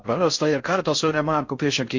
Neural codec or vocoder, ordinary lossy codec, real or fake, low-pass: codec, 16 kHz in and 24 kHz out, 0.6 kbps, FocalCodec, streaming, 4096 codes; MP3, 48 kbps; fake; 7.2 kHz